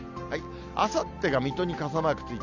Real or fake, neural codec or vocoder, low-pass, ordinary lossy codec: real; none; 7.2 kHz; none